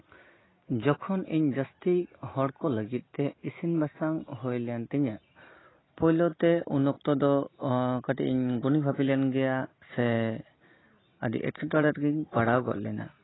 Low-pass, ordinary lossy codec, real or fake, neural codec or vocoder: 7.2 kHz; AAC, 16 kbps; real; none